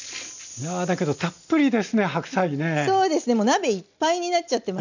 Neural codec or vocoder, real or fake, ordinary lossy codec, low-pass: none; real; none; 7.2 kHz